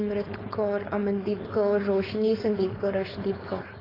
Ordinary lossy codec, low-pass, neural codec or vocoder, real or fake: AAC, 24 kbps; 5.4 kHz; codec, 16 kHz, 4.8 kbps, FACodec; fake